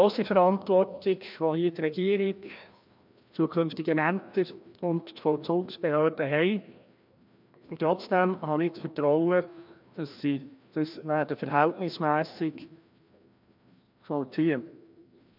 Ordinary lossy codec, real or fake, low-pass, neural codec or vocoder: MP3, 48 kbps; fake; 5.4 kHz; codec, 16 kHz, 1 kbps, FreqCodec, larger model